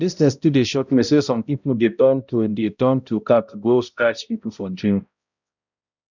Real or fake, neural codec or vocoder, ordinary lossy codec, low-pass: fake; codec, 16 kHz, 0.5 kbps, X-Codec, HuBERT features, trained on balanced general audio; none; 7.2 kHz